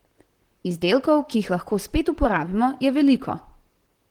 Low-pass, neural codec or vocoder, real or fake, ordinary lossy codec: 19.8 kHz; vocoder, 44.1 kHz, 128 mel bands, Pupu-Vocoder; fake; Opus, 16 kbps